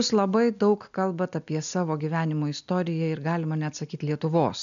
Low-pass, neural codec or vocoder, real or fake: 7.2 kHz; none; real